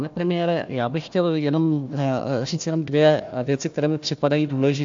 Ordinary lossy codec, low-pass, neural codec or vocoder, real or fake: AAC, 64 kbps; 7.2 kHz; codec, 16 kHz, 1 kbps, FunCodec, trained on Chinese and English, 50 frames a second; fake